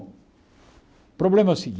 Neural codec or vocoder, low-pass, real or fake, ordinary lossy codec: none; none; real; none